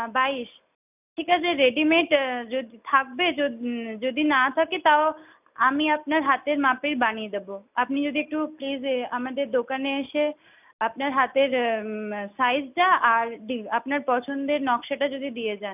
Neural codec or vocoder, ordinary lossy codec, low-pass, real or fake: none; none; 3.6 kHz; real